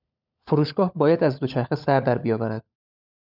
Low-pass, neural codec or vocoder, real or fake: 5.4 kHz; codec, 16 kHz, 16 kbps, FunCodec, trained on LibriTTS, 50 frames a second; fake